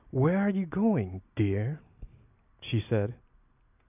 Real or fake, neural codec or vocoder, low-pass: real; none; 3.6 kHz